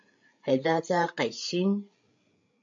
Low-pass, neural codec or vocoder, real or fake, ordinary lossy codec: 7.2 kHz; codec, 16 kHz, 8 kbps, FreqCodec, larger model; fake; AAC, 64 kbps